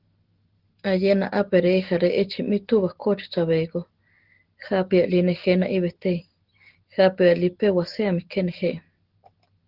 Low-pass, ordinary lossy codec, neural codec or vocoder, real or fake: 5.4 kHz; Opus, 16 kbps; none; real